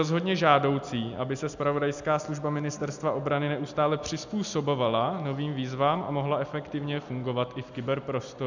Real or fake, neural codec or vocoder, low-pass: real; none; 7.2 kHz